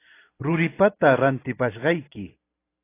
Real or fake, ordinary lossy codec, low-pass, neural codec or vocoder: fake; AAC, 24 kbps; 3.6 kHz; vocoder, 44.1 kHz, 128 mel bands every 512 samples, BigVGAN v2